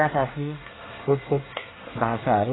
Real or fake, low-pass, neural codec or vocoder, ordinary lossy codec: fake; 7.2 kHz; codec, 24 kHz, 1 kbps, SNAC; AAC, 16 kbps